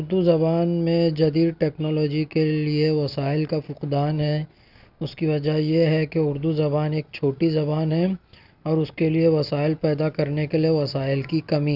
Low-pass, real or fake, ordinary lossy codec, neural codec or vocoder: 5.4 kHz; real; none; none